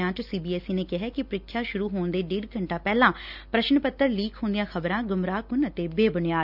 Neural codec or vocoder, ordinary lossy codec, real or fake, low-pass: none; none; real; 5.4 kHz